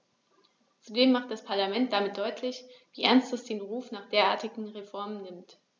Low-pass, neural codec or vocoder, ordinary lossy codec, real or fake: none; none; none; real